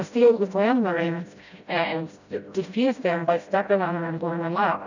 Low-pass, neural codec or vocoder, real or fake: 7.2 kHz; codec, 16 kHz, 0.5 kbps, FreqCodec, smaller model; fake